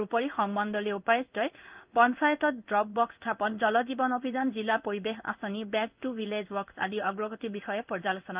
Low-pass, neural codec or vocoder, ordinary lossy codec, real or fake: 3.6 kHz; codec, 16 kHz in and 24 kHz out, 1 kbps, XY-Tokenizer; Opus, 24 kbps; fake